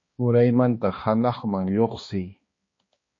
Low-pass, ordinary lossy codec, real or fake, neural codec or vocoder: 7.2 kHz; MP3, 32 kbps; fake; codec, 16 kHz, 2 kbps, X-Codec, HuBERT features, trained on balanced general audio